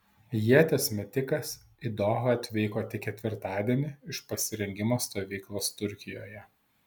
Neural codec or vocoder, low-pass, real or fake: none; 19.8 kHz; real